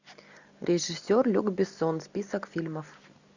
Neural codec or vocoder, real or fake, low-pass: none; real; 7.2 kHz